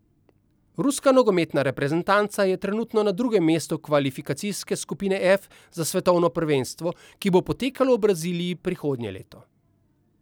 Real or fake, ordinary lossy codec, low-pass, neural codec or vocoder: real; none; none; none